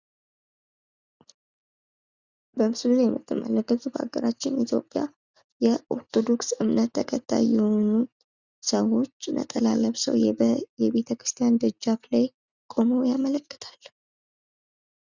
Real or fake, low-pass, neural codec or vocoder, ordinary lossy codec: real; 7.2 kHz; none; Opus, 64 kbps